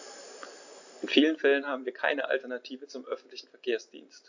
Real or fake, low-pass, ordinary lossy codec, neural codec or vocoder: real; 7.2 kHz; AAC, 48 kbps; none